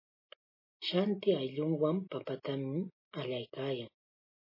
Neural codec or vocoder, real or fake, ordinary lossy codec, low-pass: none; real; MP3, 24 kbps; 5.4 kHz